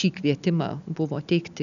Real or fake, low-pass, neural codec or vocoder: real; 7.2 kHz; none